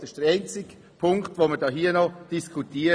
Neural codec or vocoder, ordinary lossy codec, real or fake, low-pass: none; none; real; none